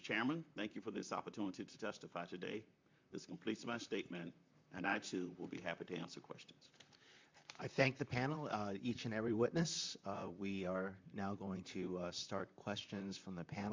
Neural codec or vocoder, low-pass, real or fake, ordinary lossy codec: vocoder, 44.1 kHz, 128 mel bands, Pupu-Vocoder; 7.2 kHz; fake; MP3, 64 kbps